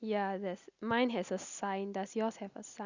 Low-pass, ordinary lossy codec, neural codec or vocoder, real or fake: 7.2 kHz; Opus, 64 kbps; none; real